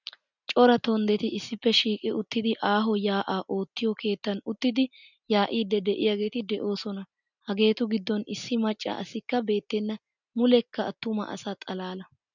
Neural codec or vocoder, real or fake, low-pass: none; real; 7.2 kHz